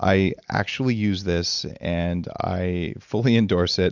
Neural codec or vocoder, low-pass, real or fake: none; 7.2 kHz; real